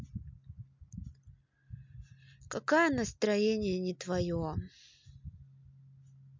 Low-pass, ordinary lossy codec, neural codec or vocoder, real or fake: 7.2 kHz; MP3, 64 kbps; none; real